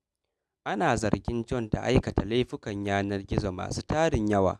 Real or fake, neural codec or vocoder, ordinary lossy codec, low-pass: real; none; none; none